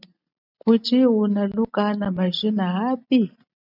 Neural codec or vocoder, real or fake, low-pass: none; real; 5.4 kHz